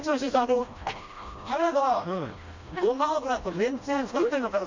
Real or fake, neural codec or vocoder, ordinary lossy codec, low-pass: fake; codec, 16 kHz, 1 kbps, FreqCodec, smaller model; MP3, 48 kbps; 7.2 kHz